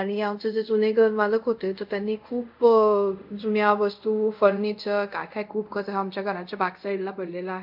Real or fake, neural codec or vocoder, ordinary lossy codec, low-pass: fake; codec, 24 kHz, 0.5 kbps, DualCodec; MP3, 48 kbps; 5.4 kHz